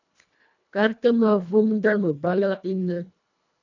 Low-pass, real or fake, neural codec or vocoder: 7.2 kHz; fake; codec, 24 kHz, 1.5 kbps, HILCodec